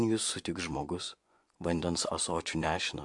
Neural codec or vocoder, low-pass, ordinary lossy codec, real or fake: vocoder, 24 kHz, 100 mel bands, Vocos; 10.8 kHz; MP3, 64 kbps; fake